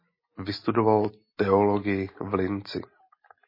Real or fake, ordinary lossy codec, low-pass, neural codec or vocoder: real; MP3, 24 kbps; 5.4 kHz; none